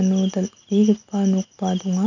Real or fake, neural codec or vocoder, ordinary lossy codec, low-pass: real; none; none; 7.2 kHz